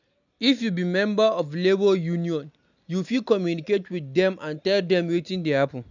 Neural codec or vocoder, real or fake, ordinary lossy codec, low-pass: none; real; none; 7.2 kHz